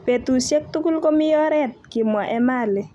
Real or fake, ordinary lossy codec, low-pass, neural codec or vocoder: real; none; none; none